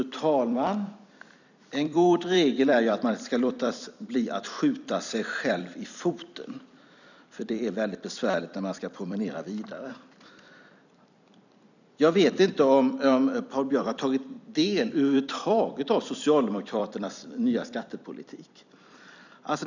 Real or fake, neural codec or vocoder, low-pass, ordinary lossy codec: fake; vocoder, 44.1 kHz, 128 mel bands every 256 samples, BigVGAN v2; 7.2 kHz; none